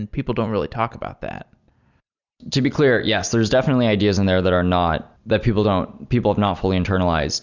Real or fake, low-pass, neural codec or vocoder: real; 7.2 kHz; none